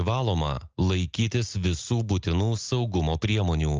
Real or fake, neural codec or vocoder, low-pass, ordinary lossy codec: real; none; 7.2 kHz; Opus, 24 kbps